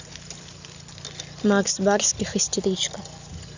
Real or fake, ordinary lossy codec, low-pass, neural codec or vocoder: real; Opus, 64 kbps; 7.2 kHz; none